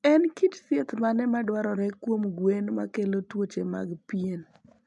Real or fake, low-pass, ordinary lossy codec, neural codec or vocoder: real; 10.8 kHz; none; none